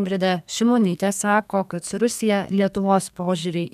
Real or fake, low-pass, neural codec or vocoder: fake; 14.4 kHz; codec, 32 kHz, 1.9 kbps, SNAC